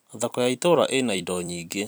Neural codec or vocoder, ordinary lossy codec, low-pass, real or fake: vocoder, 44.1 kHz, 128 mel bands every 256 samples, BigVGAN v2; none; none; fake